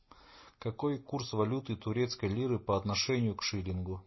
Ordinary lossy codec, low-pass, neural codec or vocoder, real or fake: MP3, 24 kbps; 7.2 kHz; none; real